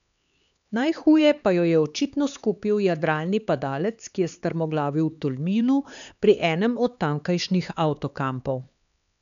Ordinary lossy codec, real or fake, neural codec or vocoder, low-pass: none; fake; codec, 16 kHz, 4 kbps, X-Codec, HuBERT features, trained on LibriSpeech; 7.2 kHz